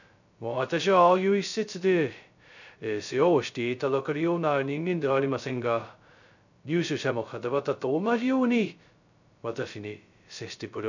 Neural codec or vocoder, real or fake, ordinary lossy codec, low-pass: codec, 16 kHz, 0.2 kbps, FocalCodec; fake; none; 7.2 kHz